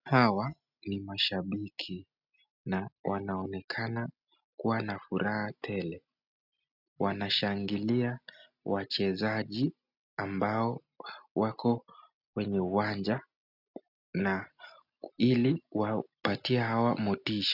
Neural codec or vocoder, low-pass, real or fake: none; 5.4 kHz; real